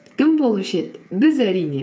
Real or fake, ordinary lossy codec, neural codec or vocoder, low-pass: fake; none; codec, 16 kHz, 8 kbps, FreqCodec, smaller model; none